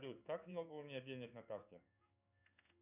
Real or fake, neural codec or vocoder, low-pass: fake; codec, 16 kHz in and 24 kHz out, 1 kbps, XY-Tokenizer; 3.6 kHz